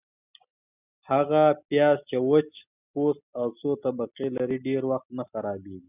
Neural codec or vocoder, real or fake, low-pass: none; real; 3.6 kHz